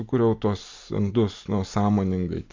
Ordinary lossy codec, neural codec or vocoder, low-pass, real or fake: MP3, 64 kbps; none; 7.2 kHz; real